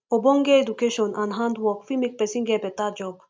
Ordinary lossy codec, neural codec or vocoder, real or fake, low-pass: none; none; real; none